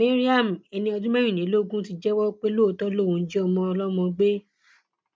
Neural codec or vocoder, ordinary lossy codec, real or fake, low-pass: none; none; real; none